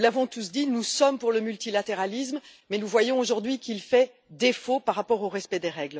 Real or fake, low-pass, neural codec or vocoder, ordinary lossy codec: real; none; none; none